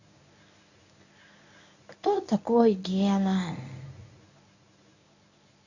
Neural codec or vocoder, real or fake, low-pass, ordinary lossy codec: codec, 24 kHz, 0.9 kbps, WavTokenizer, medium speech release version 1; fake; 7.2 kHz; none